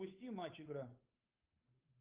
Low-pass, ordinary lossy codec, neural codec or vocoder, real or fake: 3.6 kHz; Opus, 24 kbps; codec, 16 kHz, 8 kbps, FunCodec, trained on Chinese and English, 25 frames a second; fake